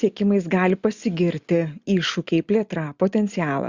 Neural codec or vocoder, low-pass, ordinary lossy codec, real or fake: none; 7.2 kHz; Opus, 64 kbps; real